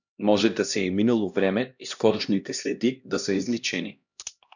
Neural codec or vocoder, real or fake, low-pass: codec, 16 kHz, 1 kbps, X-Codec, HuBERT features, trained on LibriSpeech; fake; 7.2 kHz